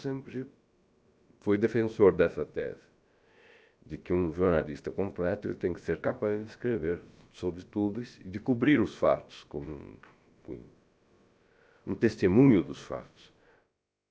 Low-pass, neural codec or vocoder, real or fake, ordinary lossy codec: none; codec, 16 kHz, about 1 kbps, DyCAST, with the encoder's durations; fake; none